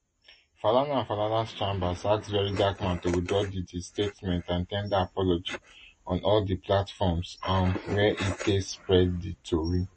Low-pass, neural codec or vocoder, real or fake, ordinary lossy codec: 10.8 kHz; none; real; MP3, 32 kbps